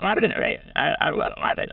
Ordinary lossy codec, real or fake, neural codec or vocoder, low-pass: Opus, 64 kbps; fake; autoencoder, 22.05 kHz, a latent of 192 numbers a frame, VITS, trained on many speakers; 5.4 kHz